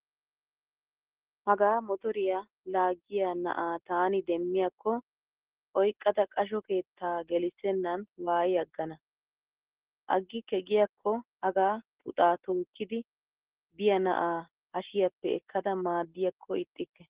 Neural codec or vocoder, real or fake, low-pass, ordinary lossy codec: none; real; 3.6 kHz; Opus, 16 kbps